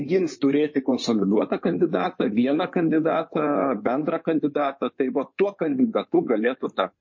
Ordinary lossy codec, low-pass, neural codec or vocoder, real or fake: MP3, 32 kbps; 7.2 kHz; codec, 16 kHz in and 24 kHz out, 2.2 kbps, FireRedTTS-2 codec; fake